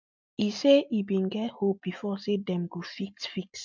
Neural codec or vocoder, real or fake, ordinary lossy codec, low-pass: none; real; MP3, 64 kbps; 7.2 kHz